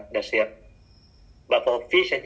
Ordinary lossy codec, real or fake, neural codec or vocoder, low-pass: none; real; none; none